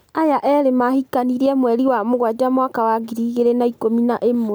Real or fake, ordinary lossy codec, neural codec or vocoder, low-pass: real; none; none; none